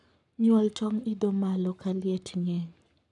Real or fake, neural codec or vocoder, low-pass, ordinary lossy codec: fake; codec, 44.1 kHz, 7.8 kbps, Pupu-Codec; 10.8 kHz; none